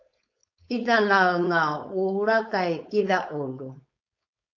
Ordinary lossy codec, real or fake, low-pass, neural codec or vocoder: AAC, 48 kbps; fake; 7.2 kHz; codec, 16 kHz, 4.8 kbps, FACodec